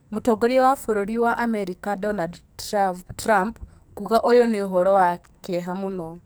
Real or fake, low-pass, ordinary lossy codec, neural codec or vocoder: fake; none; none; codec, 44.1 kHz, 2.6 kbps, SNAC